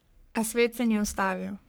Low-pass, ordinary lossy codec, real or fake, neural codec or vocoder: none; none; fake; codec, 44.1 kHz, 3.4 kbps, Pupu-Codec